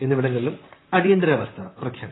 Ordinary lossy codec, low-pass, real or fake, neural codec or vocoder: AAC, 16 kbps; 7.2 kHz; fake; vocoder, 44.1 kHz, 128 mel bands, Pupu-Vocoder